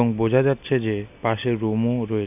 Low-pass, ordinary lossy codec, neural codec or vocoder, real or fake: 3.6 kHz; none; none; real